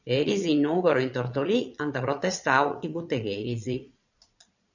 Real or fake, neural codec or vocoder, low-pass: fake; vocoder, 22.05 kHz, 80 mel bands, Vocos; 7.2 kHz